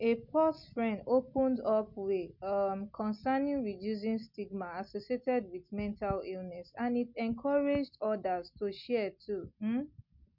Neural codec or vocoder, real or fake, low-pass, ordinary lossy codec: none; real; 5.4 kHz; none